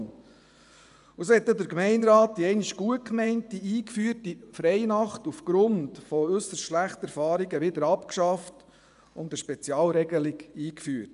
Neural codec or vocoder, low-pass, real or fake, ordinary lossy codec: vocoder, 24 kHz, 100 mel bands, Vocos; 10.8 kHz; fake; none